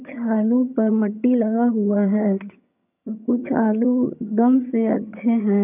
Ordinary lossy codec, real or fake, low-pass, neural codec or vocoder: none; fake; 3.6 kHz; vocoder, 22.05 kHz, 80 mel bands, HiFi-GAN